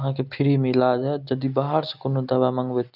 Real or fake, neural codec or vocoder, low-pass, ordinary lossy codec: real; none; 5.4 kHz; none